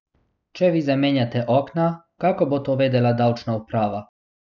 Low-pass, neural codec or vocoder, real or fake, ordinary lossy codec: 7.2 kHz; none; real; none